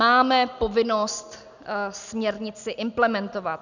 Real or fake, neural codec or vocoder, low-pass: real; none; 7.2 kHz